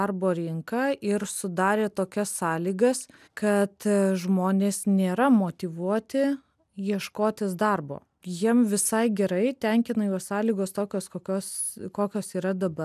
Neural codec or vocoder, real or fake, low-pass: none; real; 14.4 kHz